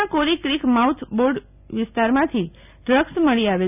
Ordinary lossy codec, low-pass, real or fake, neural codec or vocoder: none; 3.6 kHz; real; none